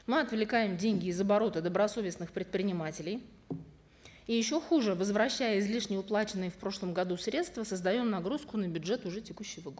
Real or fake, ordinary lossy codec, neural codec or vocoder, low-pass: real; none; none; none